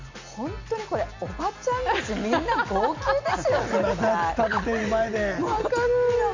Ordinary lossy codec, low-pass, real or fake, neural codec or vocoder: MP3, 64 kbps; 7.2 kHz; real; none